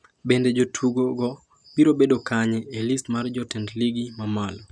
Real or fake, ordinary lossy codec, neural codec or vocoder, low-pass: real; none; none; 9.9 kHz